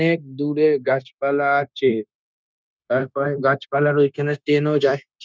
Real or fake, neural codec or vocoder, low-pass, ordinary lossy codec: fake; codec, 16 kHz, 0.9 kbps, LongCat-Audio-Codec; none; none